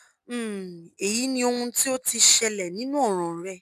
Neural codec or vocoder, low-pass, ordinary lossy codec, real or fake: none; 14.4 kHz; none; real